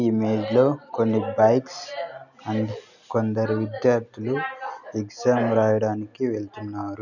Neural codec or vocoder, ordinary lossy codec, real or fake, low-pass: none; none; real; 7.2 kHz